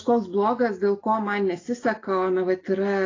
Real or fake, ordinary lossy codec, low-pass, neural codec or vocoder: real; AAC, 32 kbps; 7.2 kHz; none